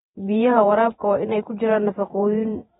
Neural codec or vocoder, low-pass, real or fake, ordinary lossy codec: vocoder, 48 kHz, 128 mel bands, Vocos; 19.8 kHz; fake; AAC, 16 kbps